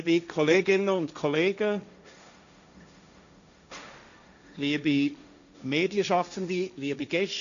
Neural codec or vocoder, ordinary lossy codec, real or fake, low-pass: codec, 16 kHz, 1.1 kbps, Voila-Tokenizer; none; fake; 7.2 kHz